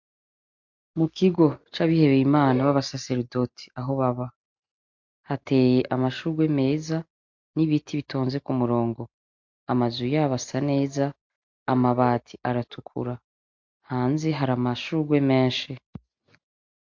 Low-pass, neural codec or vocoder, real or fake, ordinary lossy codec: 7.2 kHz; none; real; MP3, 48 kbps